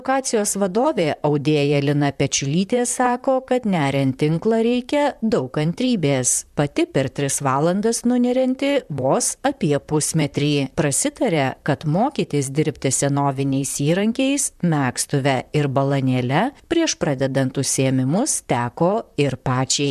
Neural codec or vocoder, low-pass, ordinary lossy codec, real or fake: vocoder, 44.1 kHz, 128 mel bands, Pupu-Vocoder; 14.4 kHz; MP3, 96 kbps; fake